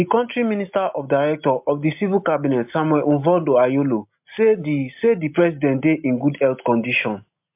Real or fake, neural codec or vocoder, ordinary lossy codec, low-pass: real; none; MP3, 32 kbps; 3.6 kHz